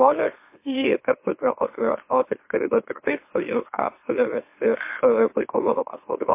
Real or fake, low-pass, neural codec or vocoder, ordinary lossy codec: fake; 3.6 kHz; autoencoder, 44.1 kHz, a latent of 192 numbers a frame, MeloTTS; MP3, 24 kbps